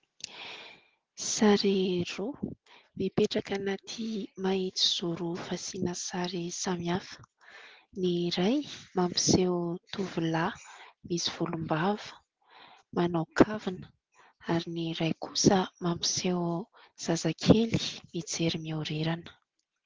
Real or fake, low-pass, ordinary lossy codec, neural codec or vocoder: real; 7.2 kHz; Opus, 16 kbps; none